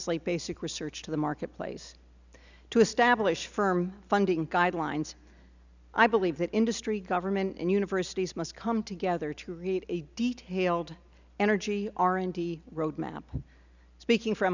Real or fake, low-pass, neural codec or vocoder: real; 7.2 kHz; none